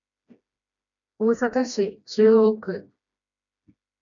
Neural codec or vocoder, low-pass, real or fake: codec, 16 kHz, 1 kbps, FreqCodec, smaller model; 7.2 kHz; fake